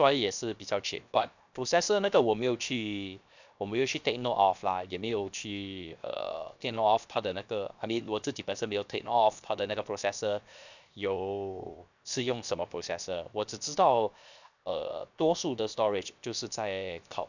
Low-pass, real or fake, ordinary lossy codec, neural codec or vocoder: 7.2 kHz; fake; none; codec, 16 kHz, 0.7 kbps, FocalCodec